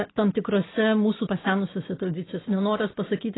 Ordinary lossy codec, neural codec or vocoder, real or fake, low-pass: AAC, 16 kbps; none; real; 7.2 kHz